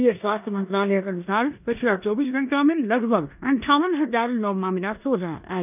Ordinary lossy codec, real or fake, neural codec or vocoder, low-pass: none; fake; codec, 16 kHz in and 24 kHz out, 0.9 kbps, LongCat-Audio-Codec, four codebook decoder; 3.6 kHz